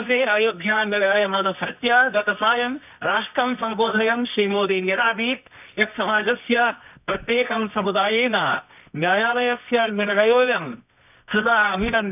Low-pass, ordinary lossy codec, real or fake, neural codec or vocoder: 3.6 kHz; none; fake; codec, 24 kHz, 0.9 kbps, WavTokenizer, medium music audio release